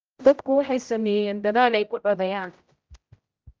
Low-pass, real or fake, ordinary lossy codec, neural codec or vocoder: 7.2 kHz; fake; Opus, 24 kbps; codec, 16 kHz, 0.5 kbps, X-Codec, HuBERT features, trained on general audio